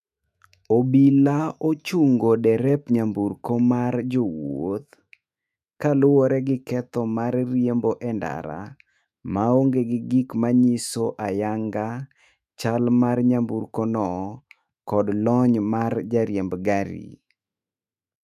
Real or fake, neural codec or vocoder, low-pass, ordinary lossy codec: fake; autoencoder, 48 kHz, 128 numbers a frame, DAC-VAE, trained on Japanese speech; 14.4 kHz; none